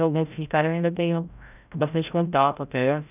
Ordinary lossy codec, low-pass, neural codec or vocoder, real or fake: none; 3.6 kHz; codec, 16 kHz, 0.5 kbps, FreqCodec, larger model; fake